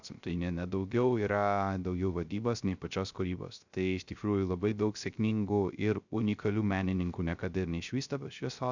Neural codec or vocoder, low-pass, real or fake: codec, 16 kHz, 0.3 kbps, FocalCodec; 7.2 kHz; fake